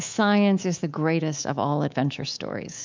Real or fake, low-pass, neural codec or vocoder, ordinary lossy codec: fake; 7.2 kHz; codec, 24 kHz, 3.1 kbps, DualCodec; MP3, 64 kbps